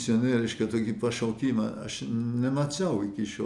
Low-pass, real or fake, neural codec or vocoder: 10.8 kHz; real; none